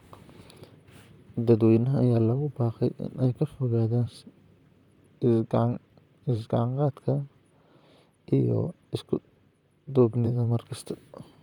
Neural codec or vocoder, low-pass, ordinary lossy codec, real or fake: vocoder, 44.1 kHz, 128 mel bands, Pupu-Vocoder; 19.8 kHz; none; fake